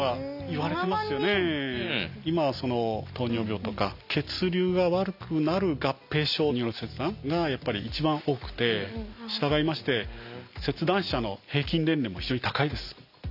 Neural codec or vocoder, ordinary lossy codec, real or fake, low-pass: none; none; real; 5.4 kHz